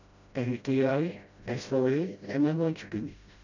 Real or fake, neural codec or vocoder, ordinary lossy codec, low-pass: fake; codec, 16 kHz, 0.5 kbps, FreqCodec, smaller model; none; 7.2 kHz